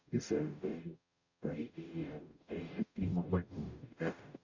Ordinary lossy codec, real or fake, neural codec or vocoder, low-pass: none; fake; codec, 44.1 kHz, 0.9 kbps, DAC; 7.2 kHz